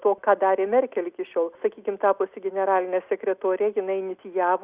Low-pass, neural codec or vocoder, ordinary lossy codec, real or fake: 3.6 kHz; none; Opus, 64 kbps; real